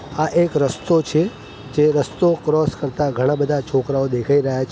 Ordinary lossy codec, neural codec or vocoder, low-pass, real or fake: none; none; none; real